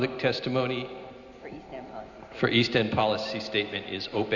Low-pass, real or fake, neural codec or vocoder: 7.2 kHz; real; none